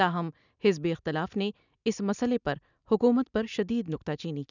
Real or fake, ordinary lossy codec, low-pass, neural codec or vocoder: real; none; 7.2 kHz; none